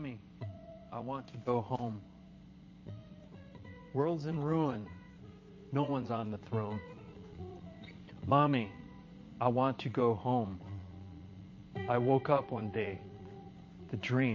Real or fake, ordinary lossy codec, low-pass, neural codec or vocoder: fake; MP3, 32 kbps; 7.2 kHz; vocoder, 22.05 kHz, 80 mel bands, Vocos